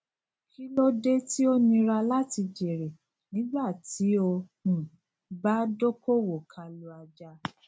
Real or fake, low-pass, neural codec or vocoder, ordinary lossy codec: real; none; none; none